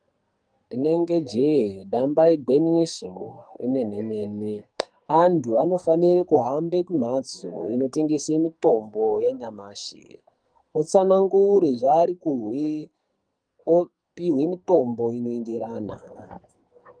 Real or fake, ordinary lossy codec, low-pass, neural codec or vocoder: fake; Opus, 32 kbps; 9.9 kHz; codec, 44.1 kHz, 2.6 kbps, SNAC